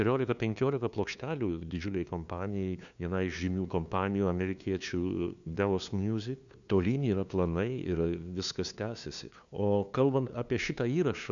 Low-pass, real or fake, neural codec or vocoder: 7.2 kHz; fake; codec, 16 kHz, 2 kbps, FunCodec, trained on LibriTTS, 25 frames a second